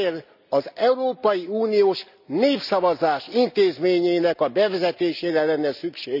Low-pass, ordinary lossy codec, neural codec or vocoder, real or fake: 5.4 kHz; none; none; real